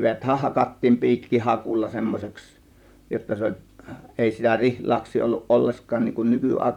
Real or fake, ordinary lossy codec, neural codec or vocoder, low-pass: fake; none; vocoder, 44.1 kHz, 128 mel bands, Pupu-Vocoder; 19.8 kHz